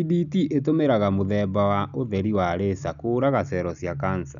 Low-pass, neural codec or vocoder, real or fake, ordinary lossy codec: 7.2 kHz; none; real; none